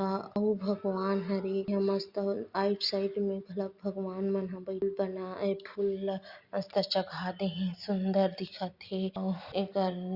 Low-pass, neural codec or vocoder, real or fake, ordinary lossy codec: 5.4 kHz; none; real; Opus, 64 kbps